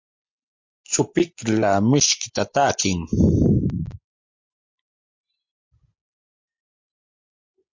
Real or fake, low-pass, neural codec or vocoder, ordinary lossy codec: real; 7.2 kHz; none; MP3, 48 kbps